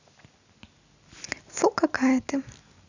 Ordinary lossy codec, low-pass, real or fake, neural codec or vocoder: none; 7.2 kHz; real; none